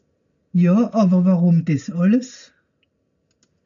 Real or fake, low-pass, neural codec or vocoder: real; 7.2 kHz; none